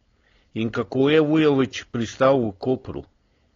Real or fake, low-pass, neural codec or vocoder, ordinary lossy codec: fake; 7.2 kHz; codec, 16 kHz, 4.8 kbps, FACodec; AAC, 32 kbps